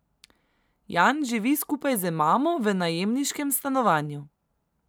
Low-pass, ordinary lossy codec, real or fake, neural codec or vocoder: none; none; real; none